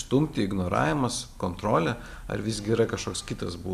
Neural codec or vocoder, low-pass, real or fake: none; 14.4 kHz; real